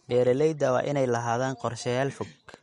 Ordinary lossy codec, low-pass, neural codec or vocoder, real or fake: MP3, 48 kbps; 19.8 kHz; none; real